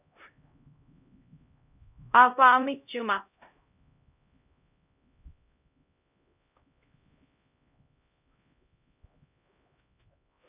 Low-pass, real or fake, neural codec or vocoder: 3.6 kHz; fake; codec, 16 kHz, 0.5 kbps, X-Codec, HuBERT features, trained on LibriSpeech